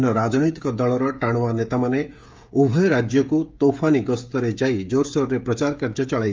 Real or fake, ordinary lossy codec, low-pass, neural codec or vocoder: fake; Opus, 32 kbps; 7.2 kHz; codec, 16 kHz, 16 kbps, FreqCodec, smaller model